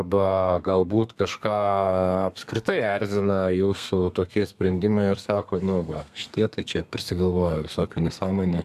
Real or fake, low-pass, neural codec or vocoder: fake; 14.4 kHz; codec, 32 kHz, 1.9 kbps, SNAC